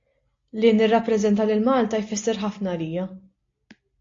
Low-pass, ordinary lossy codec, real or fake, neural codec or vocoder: 7.2 kHz; AAC, 48 kbps; real; none